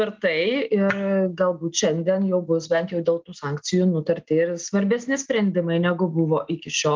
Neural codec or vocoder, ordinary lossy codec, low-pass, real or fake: none; Opus, 24 kbps; 7.2 kHz; real